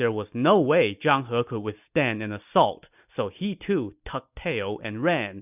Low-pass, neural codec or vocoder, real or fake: 3.6 kHz; none; real